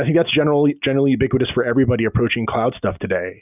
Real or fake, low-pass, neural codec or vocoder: real; 3.6 kHz; none